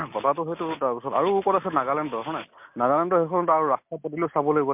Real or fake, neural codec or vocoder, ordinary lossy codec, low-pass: real; none; MP3, 32 kbps; 3.6 kHz